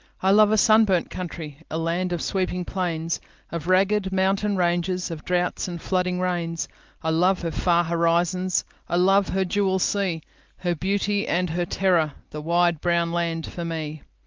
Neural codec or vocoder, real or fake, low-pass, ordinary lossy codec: none; real; 7.2 kHz; Opus, 24 kbps